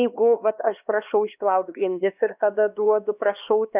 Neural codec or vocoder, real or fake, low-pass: codec, 16 kHz, 2 kbps, X-Codec, HuBERT features, trained on LibriSpeech; fake; 3.6 kHz